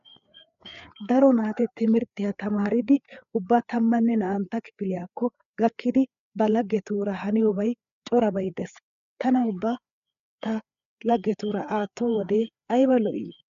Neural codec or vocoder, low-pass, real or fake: codec, 16 kHz, 4 kbps, FreqCodec, larger model; 7.2 kHz; fake